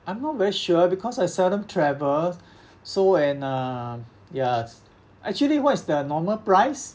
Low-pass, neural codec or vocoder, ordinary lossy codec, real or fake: none; none; none; real